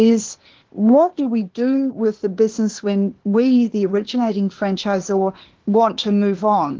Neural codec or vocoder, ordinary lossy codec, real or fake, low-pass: codec, 16 kHz, 0.8 kbps, ZipCodec; Opus, 16 kbps; fake; 7.2 kHz